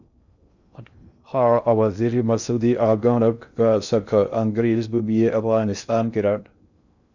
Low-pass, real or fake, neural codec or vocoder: 7.2 kHz; fake; codec, 16 kHz in and 24 kHz out, 0.6 kbps, FocalCodec, streaming, 2048 codes